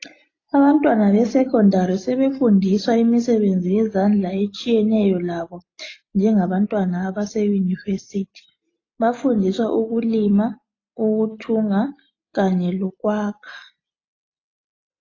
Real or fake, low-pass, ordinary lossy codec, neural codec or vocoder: real; 7.2 kHz; AAC, 32 kbps; none